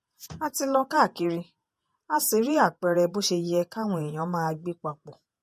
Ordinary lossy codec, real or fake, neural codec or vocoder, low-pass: MP3, 64 kbps; fake; vocoder, 48 kHz, 128 mel bands, Vocos; 14.4 kHz